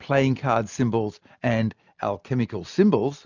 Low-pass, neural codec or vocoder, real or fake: 7.2 kHz; none; real